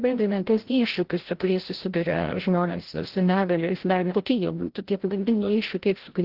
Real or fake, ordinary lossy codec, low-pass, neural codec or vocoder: fake; Opus, 16 kbps; 5.4 kHz; codec, 16 kHz, 0.5 kbps, FreqCodec, larger model